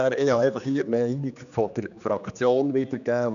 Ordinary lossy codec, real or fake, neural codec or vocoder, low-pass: none; fake; codec, 16 kHz, 2 kbps, X-Codec, HuBERT features, trained on general audio; 7.2 kHz